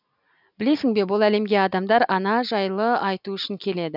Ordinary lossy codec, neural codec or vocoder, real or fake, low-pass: none; none; real; 5.4 kHz